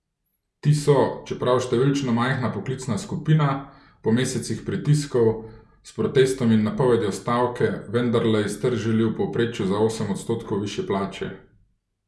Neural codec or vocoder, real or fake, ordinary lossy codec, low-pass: none; real; none; none